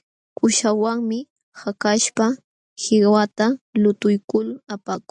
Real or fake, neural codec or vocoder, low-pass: real; none; 10.8 kHz